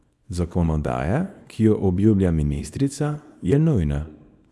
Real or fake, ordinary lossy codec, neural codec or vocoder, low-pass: fake; none; codec, 24 kHz, 0.9 kbps, WavTokenizer, small release; none